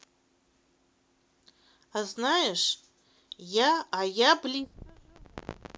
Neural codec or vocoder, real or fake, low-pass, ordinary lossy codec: none; real; none; none